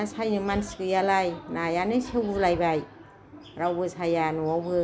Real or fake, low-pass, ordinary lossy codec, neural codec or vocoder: real; none; none; none